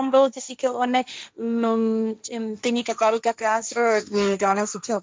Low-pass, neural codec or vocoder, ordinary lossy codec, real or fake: none; codec, 16 kHz, 1.1 kbps, Voila-Tokenizer; none; fake